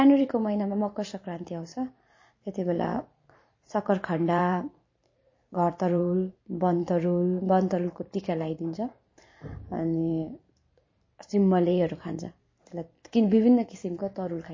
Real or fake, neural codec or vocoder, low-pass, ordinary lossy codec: fake; vocoder, 44.1 kHz, 128 mel bands every 512 samples, BigVGAN v2; 7.2 kHz; MP3, 32 kbps